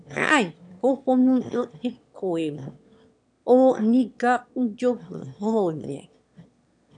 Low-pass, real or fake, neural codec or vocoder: 9.9 kHz; fake; autoencoder, 22.05 kHz, a latent of 192 numbers a frame, VITS, trained on one speaker